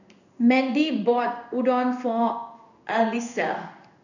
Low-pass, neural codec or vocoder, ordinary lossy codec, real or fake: 7.2 kHz; codec, 16 kHz in and 24 kHz out, 1 kbps, XY-Tokenizer; none; fake